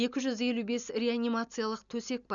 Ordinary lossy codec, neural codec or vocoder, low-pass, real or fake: none; none; 7.2 kHz; real